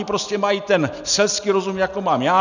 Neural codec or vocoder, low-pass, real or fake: none; 7.2 kHz; real